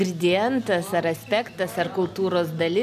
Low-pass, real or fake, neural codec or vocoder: 14.4 kHz; real; none